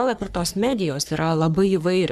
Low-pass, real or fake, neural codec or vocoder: 14.4 kHz; fake; codec, 44.1 kHz, 3.4 kbps, Pupu-Codec